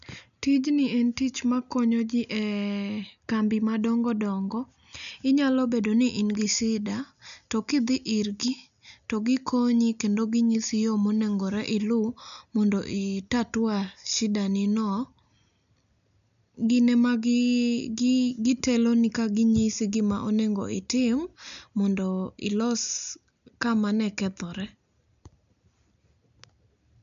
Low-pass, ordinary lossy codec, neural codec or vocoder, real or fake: 7.2 kHz; none; none; real